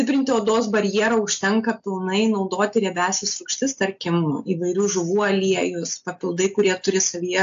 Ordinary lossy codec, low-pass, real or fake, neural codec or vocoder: AAC, 64 kbps; 7.2 kHz; real; none